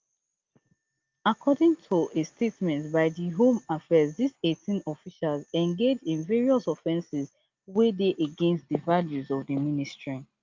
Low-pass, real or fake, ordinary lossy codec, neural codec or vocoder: 7.2 kHz; real; Opus, 24 kbps; none